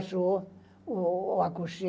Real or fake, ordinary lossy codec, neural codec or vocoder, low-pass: real; none; none; none